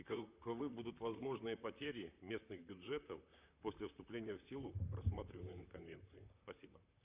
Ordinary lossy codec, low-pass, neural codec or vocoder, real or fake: Opus, 64 kbps; 3.6 kHz; vocoder, 44.1 kHz, 128 mel bands, Pupu-Vocoder; fake